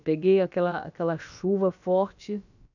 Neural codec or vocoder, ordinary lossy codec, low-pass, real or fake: codec, 16 kHz, about 1 kbps, DyCAST, with the encoder's durations; none; 7.2 kHz; fake